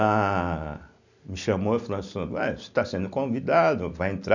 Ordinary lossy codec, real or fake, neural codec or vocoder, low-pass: none; real; none; 7.2 kHz